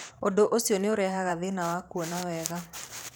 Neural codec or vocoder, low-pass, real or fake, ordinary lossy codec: vocoder, 44.1 kHz, 128 mel bands every 512 samples, BigVGAN v2; none; fake; none